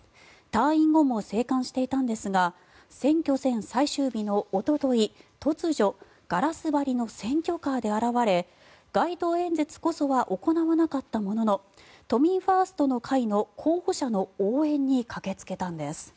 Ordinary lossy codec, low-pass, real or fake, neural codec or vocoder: none; none; real; none